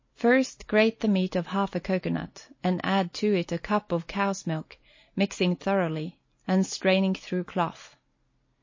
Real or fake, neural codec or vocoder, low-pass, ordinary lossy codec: real; none; 7.2 kHz; MP3, 32 kbps